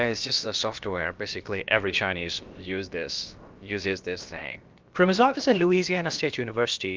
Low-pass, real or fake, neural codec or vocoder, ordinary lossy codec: 7.2 kHz; fake; codec, 16 kHz, about 1 kbps, DyCAST, with the encoder's durations; Opus, 24 kbps